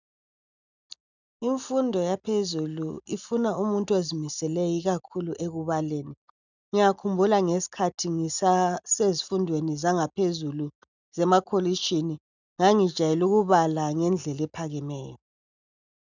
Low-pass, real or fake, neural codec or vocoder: 7.2 kHz; real; none